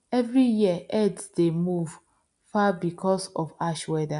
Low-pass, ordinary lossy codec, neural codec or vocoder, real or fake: 10.8 kHz; none; none; real